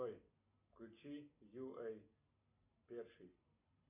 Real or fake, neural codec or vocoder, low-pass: real; none; 3.6 kHz